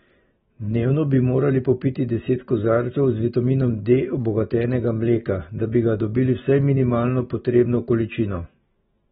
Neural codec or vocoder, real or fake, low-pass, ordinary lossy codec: none; real; 10.8 kHz; AAC, 16 kbps